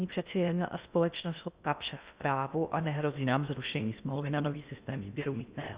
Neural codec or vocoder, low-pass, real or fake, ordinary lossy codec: codec, 16 kHz in and 24 kHz out, 0.6 kbps, FocalCodec, streaming, 2048 codes; 3.6 kHz; fake; Opus, 64 kbps